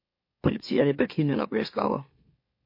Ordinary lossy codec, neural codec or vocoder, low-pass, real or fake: MP3, 32 kbps; autoencoder, 44.1 kHz, a latent of 192 numbers a frame, MeloTTS; 5.4 kHz; fake